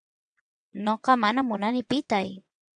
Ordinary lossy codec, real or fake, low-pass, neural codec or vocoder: MP3, 96 kbps; fake; 9.9 kHz; vocoder, 22.05 kHz, 80 mel bands, WaveNeXt